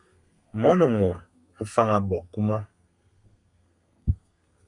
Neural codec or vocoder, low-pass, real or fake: codec, 32 kHz, 1.9 kbps, SNAC; 10.8 kHz; fake